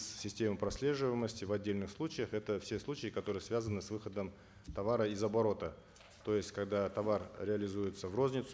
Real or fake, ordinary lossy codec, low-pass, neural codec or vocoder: real; none; none; none